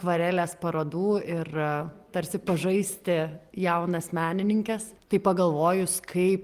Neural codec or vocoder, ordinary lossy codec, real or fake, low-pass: none; Opus, 32 kbps; real; 14.4 kHz